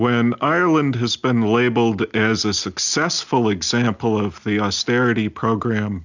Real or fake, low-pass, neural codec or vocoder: real; 7.2 kHz; none